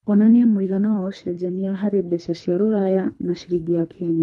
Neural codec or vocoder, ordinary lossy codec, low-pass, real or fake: codec, 24 kHz, 3 kbps, HILCodec; none; none; fake